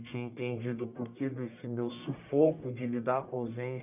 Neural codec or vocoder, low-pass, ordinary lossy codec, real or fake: codec, 44.1 kHz, 1.7 kbps, Pupu-Codec; 3.6 kHz; none; fake